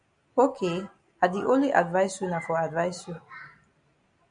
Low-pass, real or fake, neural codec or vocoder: 9.9 kHz; real; none